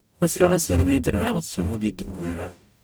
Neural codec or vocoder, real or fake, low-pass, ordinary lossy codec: codec, 44.1 kHz, 0.9 kbps, DAC; fake; none; none